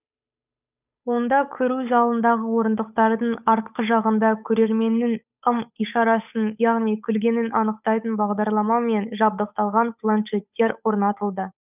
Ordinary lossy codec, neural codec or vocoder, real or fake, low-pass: none; codec, 16 kHz, 8 kbps, FunCodec, trained on Chinese and English, 25 frames a second; fake; 3.6 kHz